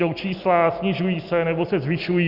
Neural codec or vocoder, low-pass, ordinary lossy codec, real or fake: none; 5.4 kHz; Opus, 64 kbps; real